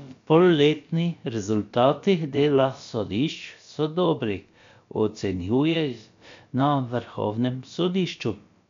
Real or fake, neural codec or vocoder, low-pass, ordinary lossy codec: fake; codec, 16 kHz, about 1 kbps, DyCAST, with the encoder's durations; 7.2 kHz; MP3, 64 kbps